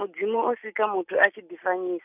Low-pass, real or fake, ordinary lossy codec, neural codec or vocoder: 3.6 kHz; real; none; none